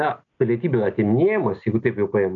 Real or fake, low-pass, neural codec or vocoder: real; 7.2 kHz; none